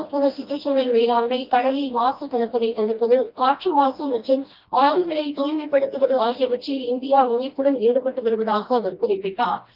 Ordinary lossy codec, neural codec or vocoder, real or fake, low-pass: Opus, 24 kbps; codec, 16 kHz, 1 kbps, FreqCodec, smaller model; fake; 5.4 kHz